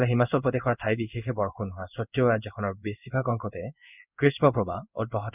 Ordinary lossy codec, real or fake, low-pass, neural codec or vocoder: none; fake; 3.6 kHz; codec, 16 kHz in and 24 kHz out, 1 kbps, XY-Tokenizer